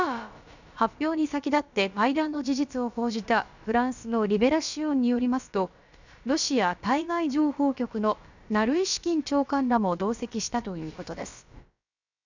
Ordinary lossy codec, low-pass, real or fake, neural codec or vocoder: none; 7.2 kHz; fake; codec, 16 kHz, about 1 kbps, DyCAST, with the encoder's durations